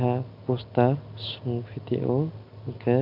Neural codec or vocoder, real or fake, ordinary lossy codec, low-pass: none; real; MP3, 48 kbps; 5.4 kHz